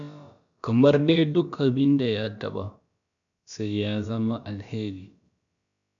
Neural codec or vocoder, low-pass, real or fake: codec, 16 kHz, about 1 kbps, DyCAST, with the encoder's durations; 7.2 kHz; fake